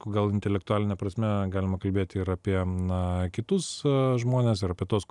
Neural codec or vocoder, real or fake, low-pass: vocoder, 44.1 kHz, 128 mel bands every 256 samples, BigVGAN v2; fake; 10.8 kHz